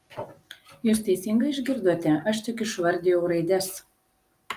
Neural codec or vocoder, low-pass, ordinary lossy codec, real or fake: none; 14.4 kHz; Opus, 32 kbps; real